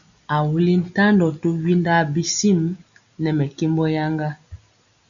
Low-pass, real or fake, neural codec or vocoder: 7.2 kHz; real; none